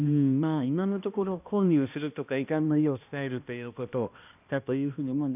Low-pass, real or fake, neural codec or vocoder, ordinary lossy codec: 3.6 kHz; fake; codec, 16 kHz, 1 kbps, X-Codec, HuBERT features, trained on balanced general audio; none